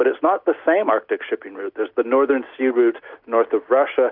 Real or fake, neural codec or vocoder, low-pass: real; none; 5.4 kHz